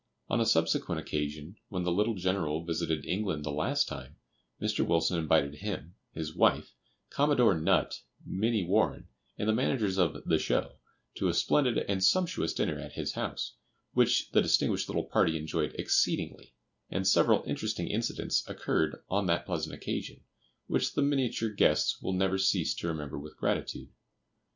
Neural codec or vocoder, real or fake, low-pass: none; real; 7.2 kHz